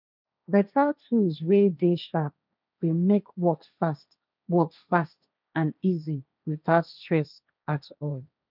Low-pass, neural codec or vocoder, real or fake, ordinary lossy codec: 5.4 kHz; codec, 16 kHz, 1.1 kbps, Voila-Tokenizer; fake; none